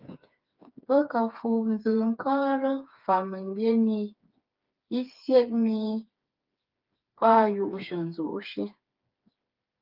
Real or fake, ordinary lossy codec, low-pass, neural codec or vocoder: fake; Opus, 24 kbps; 5.4 kHz; codec, 16 kHz, 4 kbps, FreqCodec, smaller model